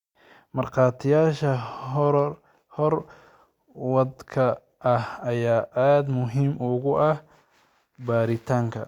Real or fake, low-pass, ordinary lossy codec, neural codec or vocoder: real; 19.8 kHz; Opus, 64 kbps; none